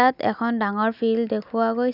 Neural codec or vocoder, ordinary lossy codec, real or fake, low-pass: none; none; real; 5.4 kHz